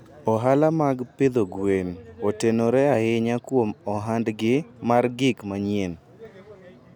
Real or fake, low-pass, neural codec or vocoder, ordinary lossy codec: real; 19.8 kHz; none; none